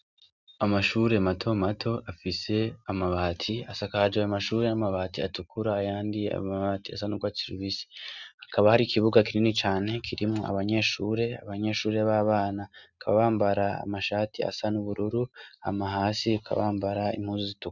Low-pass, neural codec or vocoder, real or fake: 7.2 kHz; none; real